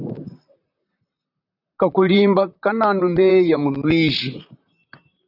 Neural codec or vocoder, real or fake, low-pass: vocoder, 44.1 kHz, 128 mel bands, Pupu-Vocoder; fake; 5.4 kHz